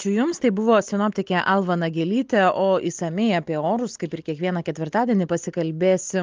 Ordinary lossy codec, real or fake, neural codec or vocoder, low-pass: Opus, 32 kbps; real; none; 7.2 kHz